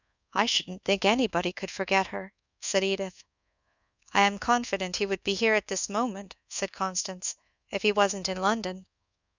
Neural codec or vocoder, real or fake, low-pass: codec, 24 kHz, 1.2 kbps, DualCodec; fake; 7.2 kHz